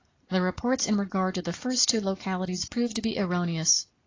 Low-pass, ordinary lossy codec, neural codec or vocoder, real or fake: 7.2 kHz; AAC, 32 kbps; none; real